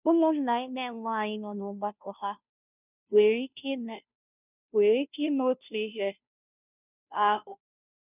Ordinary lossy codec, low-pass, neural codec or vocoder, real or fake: none; 3.6 kHz; codec, 16 kHz, 0.5 kbps, FunCodec, trained on Chinese and English, 25 frames a second; fake